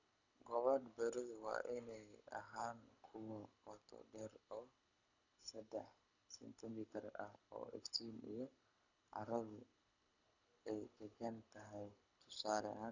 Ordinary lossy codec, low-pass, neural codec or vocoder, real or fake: none; 7.2 kHz; codec, 24 kHz, 6 kbps, HILCodec; fake